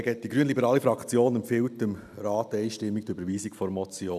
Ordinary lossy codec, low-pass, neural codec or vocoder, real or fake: none; 14.4 kHz; none; real